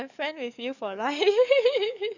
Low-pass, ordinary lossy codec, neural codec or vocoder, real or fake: 7.2 kHz; none; codec, 44.1 kHz, 7.8 kbps, DAC; fake